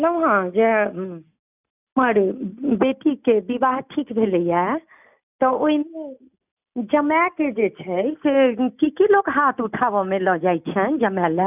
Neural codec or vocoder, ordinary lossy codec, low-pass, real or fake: none; none; 3.6 kHz; real